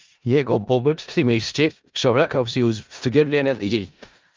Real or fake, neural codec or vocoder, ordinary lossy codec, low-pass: fake; codec, 16 kHz in and 24 kHz out, 0.4 kbps, LongCat-Audio-Codec, four codebook decoder; Opus, 24 kbps; 7.2 kHz